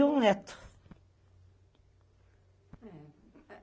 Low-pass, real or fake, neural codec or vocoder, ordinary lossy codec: none; real; none; none